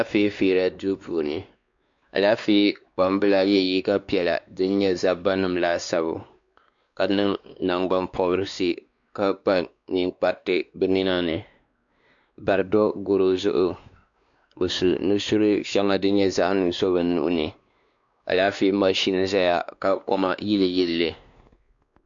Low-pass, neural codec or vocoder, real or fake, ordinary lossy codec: 7.2 kHz; codec, 16 kHz, 2 kbps, X-Codec, WavLM features, trained on Multilingual LibriSpeech; fake; MP3, 64 kbps